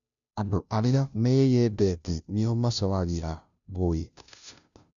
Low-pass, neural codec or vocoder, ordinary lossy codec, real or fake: 7.2 kHz; codec, 16 kHz, 0.5 kbps, FunCodec, trained on Chinese and English, 25 frames a second; none; fake